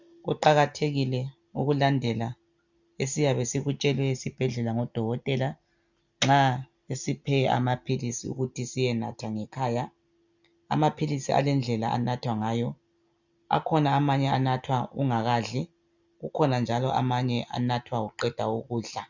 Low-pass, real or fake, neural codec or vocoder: 7.2 kHz; real; none